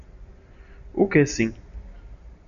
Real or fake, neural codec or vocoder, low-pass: real; none; 7.2 kHz